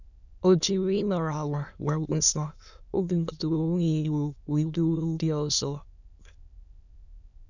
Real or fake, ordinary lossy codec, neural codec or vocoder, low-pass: fake; none; autoencoder, 22.05 kHz, a latent of 192 numbers a frame, VITS, trained on many speakers; 7.2 kHz